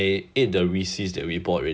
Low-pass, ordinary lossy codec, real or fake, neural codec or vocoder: none; none; real; none